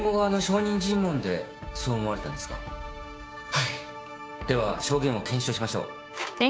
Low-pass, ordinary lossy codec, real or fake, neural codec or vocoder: none; none; fake; codec, 16 kHz, 6 kbps, DAC